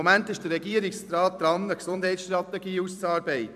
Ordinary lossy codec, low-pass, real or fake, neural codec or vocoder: none; 14.4 kHz; fake; vocoder, 44.1 kHz, 128 mel bands every 256 samples, BigVGAN v2